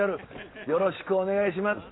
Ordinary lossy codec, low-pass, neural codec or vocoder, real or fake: AAC, 16 kbps; 7.2 kHz; none; real